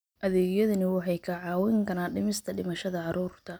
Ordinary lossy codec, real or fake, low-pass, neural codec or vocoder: none; real; none; none